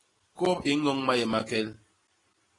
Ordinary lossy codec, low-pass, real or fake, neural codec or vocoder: AAC, 32 kbps; 10.8 kHz; real; none